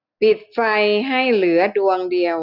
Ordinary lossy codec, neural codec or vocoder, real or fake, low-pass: none; none; real; 5.4 kHz